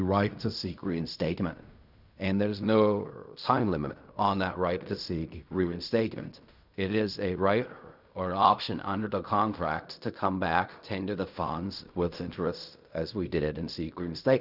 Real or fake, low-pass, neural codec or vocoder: fake; 5.4 kHz; codec, 16 kHz in and 24 kHz out, 0.4 kbps, LongCat-Audio-Codec, fine tuned four codebook decoder